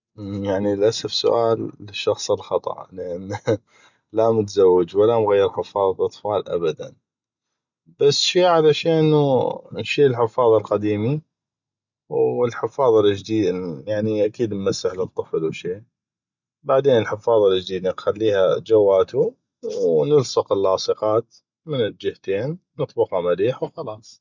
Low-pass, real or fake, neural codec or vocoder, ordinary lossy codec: 7.2 kHz; real; none; none